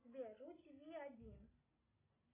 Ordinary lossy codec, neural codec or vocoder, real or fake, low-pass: MP3, 16 kbps; none; real; 3.6 kHz